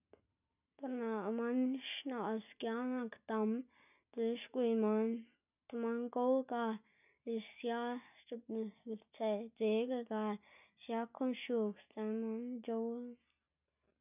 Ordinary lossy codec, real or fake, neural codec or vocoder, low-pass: none; real; none; 3.6 kHz